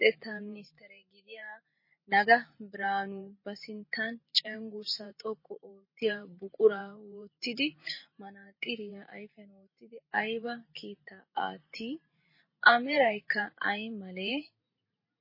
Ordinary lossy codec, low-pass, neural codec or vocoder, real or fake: MP3, 24 kbps; 5.4 kHz; vocoder, 44.1 kHz, 128 mel bands every 512 samples, BigVGAN v2; fake